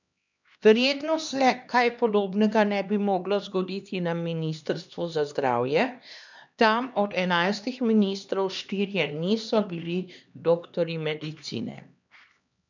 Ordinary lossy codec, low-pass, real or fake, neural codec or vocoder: none; 7.2 kHz; fake; codec, 16 kHz, 2 kbps, X-Codec, HuBERT features, trained on LibriSpeech